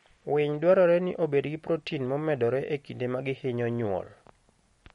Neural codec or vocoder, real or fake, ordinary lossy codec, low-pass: none; real; MP3, 48 kbps; 19.8 kHz